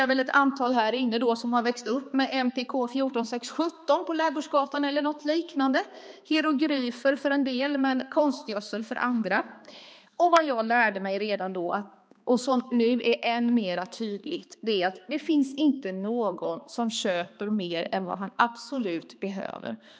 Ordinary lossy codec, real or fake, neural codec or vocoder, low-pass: none; fake; codec, 16 kHz, 2 kbps, X-Codec, HuBERT features, trained on balanced general audio; none